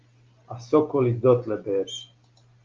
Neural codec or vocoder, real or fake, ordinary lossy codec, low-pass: none; real; Opus, 24 kbps; 7.2 kHz